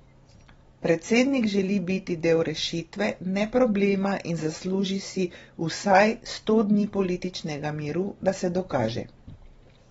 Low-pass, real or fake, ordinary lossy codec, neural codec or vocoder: 19.8 kHz; real; AAC, 24 kbps; none